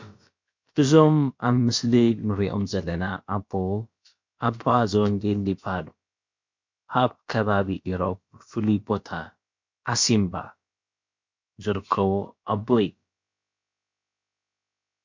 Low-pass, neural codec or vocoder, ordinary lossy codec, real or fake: 7.2 kHz; codec, 16 kHz, about 1 kbps, DyCAST, with the encoder's durations; MP3, 48 kbps; fake